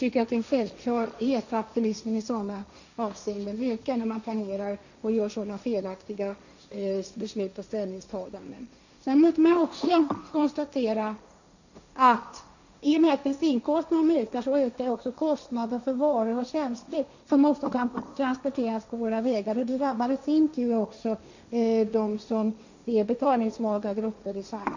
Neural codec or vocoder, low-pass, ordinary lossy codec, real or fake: codec, 16 kHz, 1.1 kbps, Voila-Tokenizer; 7.2 kHz; none; fake